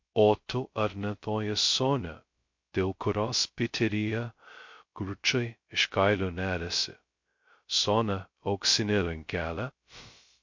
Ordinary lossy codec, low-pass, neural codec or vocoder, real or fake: MP3, 48 kbps; 7.2 kHz; codec, 16 kHz, 0.2 kbps, FocalCodec; fake